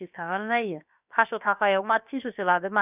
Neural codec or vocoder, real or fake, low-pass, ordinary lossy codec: codec, 16 kHz, about 1 kbps, DyCAST, with the encoder's durations; fake; 3.6 kHz; none